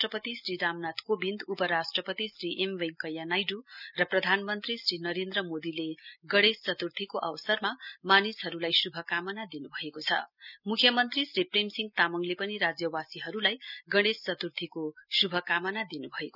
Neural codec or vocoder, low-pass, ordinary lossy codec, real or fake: none; 5.4 kHz; none; real